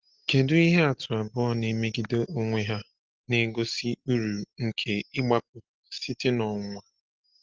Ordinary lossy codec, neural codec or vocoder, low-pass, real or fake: Opus, 16 kbps; none; 7.2 kHz; real